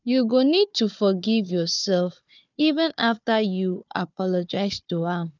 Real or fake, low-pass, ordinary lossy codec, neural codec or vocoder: fake; 7.2 kHz; none; codec, 16 kHz, 4 kbps, FunCodec, trained on Chinese and English, 50 frames a second